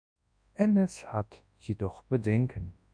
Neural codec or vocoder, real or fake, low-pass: codec, 24 kHz, 0.9 kbps, WavTokenizer, large speech release; fake; 9.9 kHz